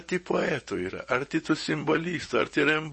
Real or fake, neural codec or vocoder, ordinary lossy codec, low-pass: fake; vocoder, 24 kHz, 100 mel bands, Vocos; MP3, 32 kbps; 10.8 kHz